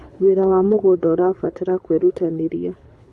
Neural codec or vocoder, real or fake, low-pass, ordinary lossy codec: codec, 24 kHz, 6 kbps, HILCodec; fake; none; none